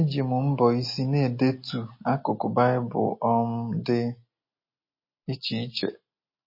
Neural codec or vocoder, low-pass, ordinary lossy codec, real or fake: none; 5.4 kHz; MP3, 24 kbps; real